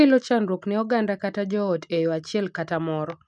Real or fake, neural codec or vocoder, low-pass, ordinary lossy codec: real; none; 10.8 kHz; none